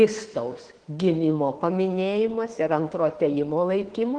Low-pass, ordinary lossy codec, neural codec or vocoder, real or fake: 9.9 kHz; Opus, 16 kbps; autoencoder, 48 kHz, 32 numbers a frame, DAC-VAE, trained on Japanese speech; fake